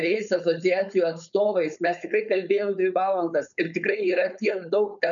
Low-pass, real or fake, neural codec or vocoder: 7.2 kHz; fake; codec, 16 kHz, 4.8 kbps, FACodec